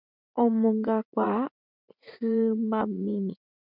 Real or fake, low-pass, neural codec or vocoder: fake; 5.4 kHz; vocoder, 44.1 kHz, 80 mel bands, Vocos